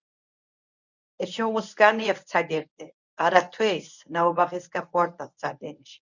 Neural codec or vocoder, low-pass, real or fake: codec, 24 kHz, 0.9 kbps, WavTokenizer, medium speech release version 1; 7.2 kHz; fake